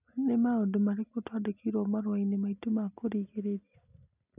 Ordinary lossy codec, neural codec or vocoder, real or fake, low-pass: none; none; real; 3.6 kHz